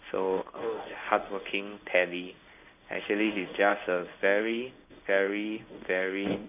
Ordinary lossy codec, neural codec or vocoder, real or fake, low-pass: none; codec, 16 kHz in and 24 kHz out, 1 kbps, XY-Tokenizer; fake; 3.6 kHz